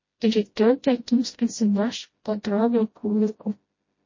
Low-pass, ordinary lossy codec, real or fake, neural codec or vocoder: 7.2 kHz; MP3, 32 kbps; fake; codec, 16 kHz, 0.5 kbps, FreqCodec, smaller model